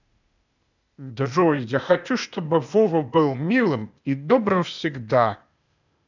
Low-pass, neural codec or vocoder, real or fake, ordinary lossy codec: 7.2 kHz; codec, 16 kHz, 0.8 kbps, ZipCodec; fake; none